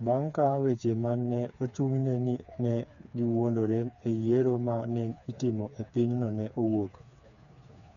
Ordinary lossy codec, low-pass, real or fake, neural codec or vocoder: MP3, 96 kbps; 7.2 kHz; fake; codec, 16 kHz, 4 kbps, FreqCodec, smaller model